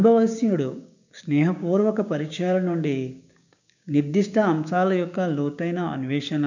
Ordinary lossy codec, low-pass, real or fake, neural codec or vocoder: none; 7.2 kHz; fake; codec, 16 kHz, 6 kbps, DAC